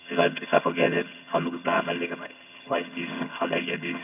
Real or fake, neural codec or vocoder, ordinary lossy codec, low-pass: fake; vocoder, 22.05 kHz, 80 mel bands, HiFi-GAN; none; 3.6 kHz